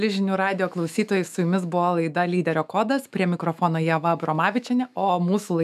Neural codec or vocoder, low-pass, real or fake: autoencoder, 48 kHz, 128 numbers a frame, DAC-VAE, trained on Japanese speech; 14.4 kHz; fake